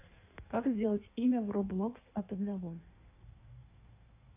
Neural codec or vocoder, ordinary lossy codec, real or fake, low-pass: codec, 16 kHz in and 24 kHz out, 1.1 kbps, FireRedTTS-2 codec; none; fake; 3.6 kHz